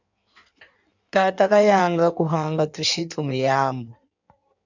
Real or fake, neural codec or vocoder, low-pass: fake; codec, 16 kHz in and 24 kHz out, 1.1 kbps, FireRedTTS-2 codec; 7.2 kHz